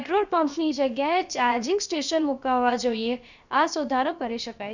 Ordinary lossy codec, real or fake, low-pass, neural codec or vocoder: none; fake; 7.2 kHz; codec, 16 kHz, about 1 kbps, DyCAST, with the encoder's durations